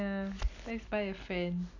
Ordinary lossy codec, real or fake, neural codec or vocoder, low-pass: none; real; none; 7.2 kHz